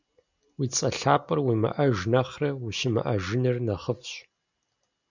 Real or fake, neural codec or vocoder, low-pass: real; none; 7.2 kHz